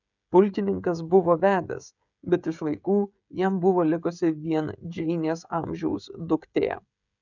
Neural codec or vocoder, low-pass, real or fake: codec, 16 kHz, 16 kbps, FreqCodec, smaller model; 7.2 kHz; fake